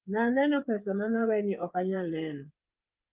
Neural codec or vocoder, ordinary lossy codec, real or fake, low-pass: codec, 16 kHz, 8 kbps, FreqCodec, smaller model; Opus, 32 kbps; fake; 3.6 kHz